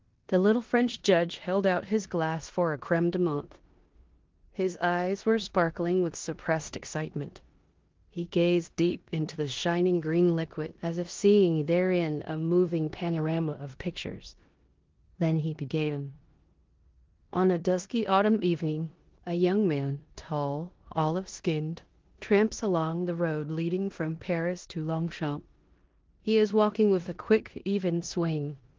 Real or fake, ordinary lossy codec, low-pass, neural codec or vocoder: fake; Opus, 16 kbps; 7.2 kHz; codec, 16 kHz in and 24 kHz out, 0.9 kbps, LongCat-Audio-Codec, four codebook decoder